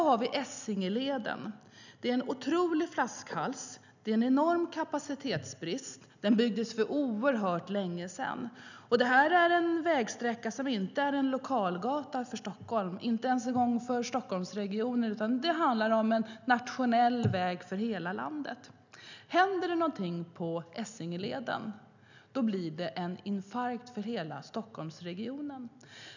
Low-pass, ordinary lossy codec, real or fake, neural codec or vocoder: 7.2 kHz; none; real; none